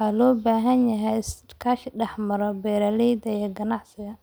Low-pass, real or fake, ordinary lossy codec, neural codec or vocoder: none; real; none; none